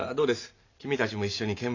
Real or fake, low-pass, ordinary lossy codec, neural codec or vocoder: real; 7.2 kHz; AAC, 48 kbps; none